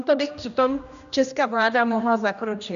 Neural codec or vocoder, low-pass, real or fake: codec, 16 kHz, 1 kbps, X-Codec, HuBERT features, trained on general audio; 7.2 kHz; fake